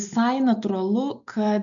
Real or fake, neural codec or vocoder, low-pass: real; none; 7.2 kHz